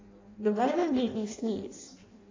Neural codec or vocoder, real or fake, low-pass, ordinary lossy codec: codec, 16 kHz in and 24 kHz out, 0.6 kbps, FireRedTTS-2 codec; fake; 7.2 kHz; AAC, 32 kbps